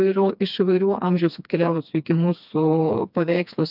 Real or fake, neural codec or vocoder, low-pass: fake; codec, 16 kHz, 2 kbps, FreqCodec, smaller model; 5.4 kHz